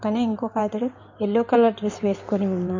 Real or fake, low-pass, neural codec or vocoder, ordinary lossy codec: fake; 7.2 kHz; codec, 16 kHz in and 24 kHz out, 2.2 kbps, FireRedTTS-2 codec; MP3, 48 kbps